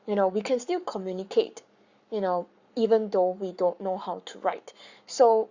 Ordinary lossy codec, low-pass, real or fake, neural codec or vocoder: Opus, 64 kbps; 7.2 kHz; fake; codec, 44.1 kHz, 7.8 kbps, Pupu-Codec